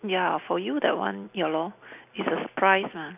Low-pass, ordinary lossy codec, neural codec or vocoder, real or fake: 3.6 kHz; none; none; real